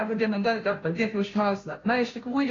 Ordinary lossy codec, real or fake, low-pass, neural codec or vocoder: AAC, 32 kbps; fake; 7.2 kHz; codec, 16 kHz, 0.5 kbps, FunCodec, trained on Chinese and English, 25 frames a second